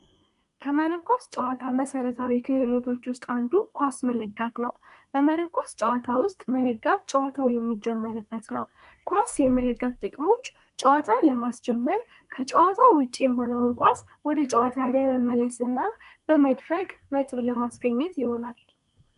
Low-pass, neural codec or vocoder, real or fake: 10.8 kHz; codec, 24 kHz, 1 kbps, SNAC; fake